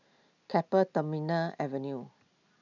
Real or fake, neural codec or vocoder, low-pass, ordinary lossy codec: real; none; 7.2 kHz; none